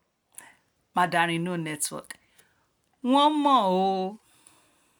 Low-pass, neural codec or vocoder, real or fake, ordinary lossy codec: none; none; real; none